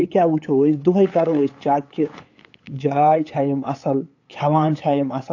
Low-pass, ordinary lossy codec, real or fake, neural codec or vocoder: 7.2 kHz; AAC, 48 kbps; fake; codec, 16 kHz, 8 kbps, FunCodec, trained on LibriTTS, 25 frames a second